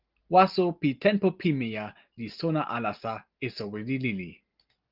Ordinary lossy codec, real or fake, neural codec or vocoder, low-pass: Opus, 32 kbps; real; none; 5.4 kHz